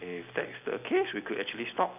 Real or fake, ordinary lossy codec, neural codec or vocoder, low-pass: real; none; none; 3.6 kHz